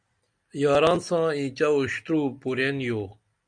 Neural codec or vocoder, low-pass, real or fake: none; 9.9 kHz; real